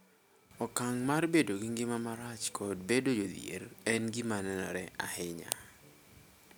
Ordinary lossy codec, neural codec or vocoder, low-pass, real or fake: none; none; none; real